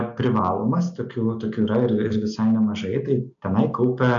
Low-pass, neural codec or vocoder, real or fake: 7.2 kHz; none; real